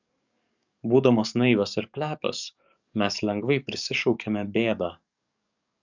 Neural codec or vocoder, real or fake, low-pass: codec, 44.1 kHz, 7.8 kbps, DAC; fake; 7.2 kHz